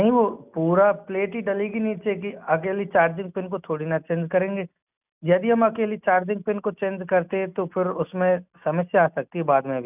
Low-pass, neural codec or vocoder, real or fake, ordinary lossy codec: 3.6 kHz; none; real; none